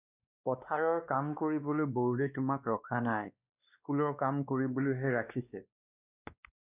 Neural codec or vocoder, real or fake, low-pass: codec, 16 kHz, 2 kbps, X-Codec, WavLM features, trained on Multilingual LibriSpeech; fake; 3.6 kHz